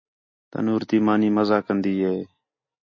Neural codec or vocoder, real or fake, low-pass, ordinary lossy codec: none; real; 7.2 kHz; MP3, 32 kbps